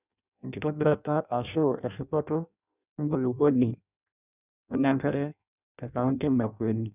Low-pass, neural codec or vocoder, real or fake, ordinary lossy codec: 3.6 kHz; codec, 16 kHz in and 24 kHz out, 0.6 kbps, FireRedTTS-2 codec; fake; none